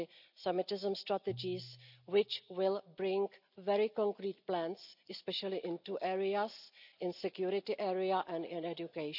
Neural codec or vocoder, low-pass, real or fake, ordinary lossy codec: none; 5.4 kHz; real; none